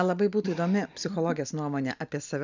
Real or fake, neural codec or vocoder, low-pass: real; none; 7.2 kHz